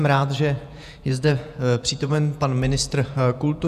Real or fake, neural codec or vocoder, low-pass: fake; vocoder, 48 kHz, 128 mel bands, Vocos; 14.4 kHz